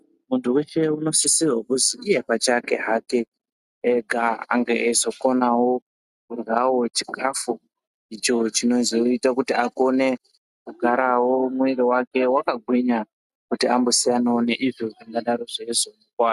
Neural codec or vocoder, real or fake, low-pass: none; real; 14.4 kHz